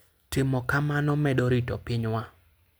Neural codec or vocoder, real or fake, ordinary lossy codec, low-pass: none; real; none; none